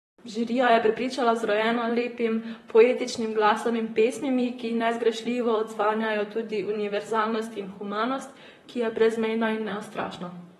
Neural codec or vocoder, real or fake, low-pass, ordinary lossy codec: vocoder, 44.1 kHz, 128 mel bands, Pupu-Vocoder; fake; 19.8 kHz; AAC, 32 kbps